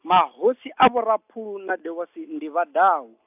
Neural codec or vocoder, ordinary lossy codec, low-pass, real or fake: none; AAC, 32 kbps; 3.6 kHz; real